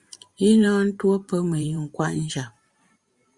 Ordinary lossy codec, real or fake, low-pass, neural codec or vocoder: Opus, 64 kbps; fake; 10.8 kHz; vocoder, 44.1 kHz, 128 mel bands every 512 samples, BigVGAN v2